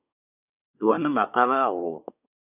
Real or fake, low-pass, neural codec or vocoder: fake; 3.6 kHz; codec, 24 kHz, 1 kbps, SNAC